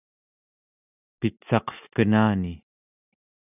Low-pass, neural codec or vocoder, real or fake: 3.6 kHz; none; real